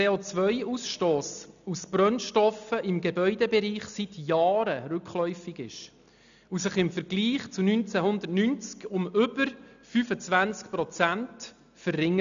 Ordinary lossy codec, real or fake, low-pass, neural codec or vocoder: none; real; 7.2 kHz; none